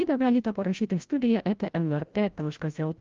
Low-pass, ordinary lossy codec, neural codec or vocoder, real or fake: 7.2 kHz; Opus, 32 kbps; codec, 16 kHz, 0.5 kbps, FreqCodec, larger model; fake